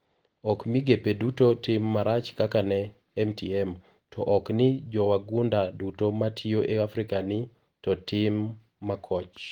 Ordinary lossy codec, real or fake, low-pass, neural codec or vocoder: Opus, 24 kbps; real; 19.8 kHz; none